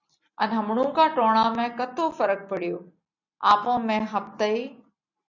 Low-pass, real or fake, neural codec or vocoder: 7.2 kHz; real; none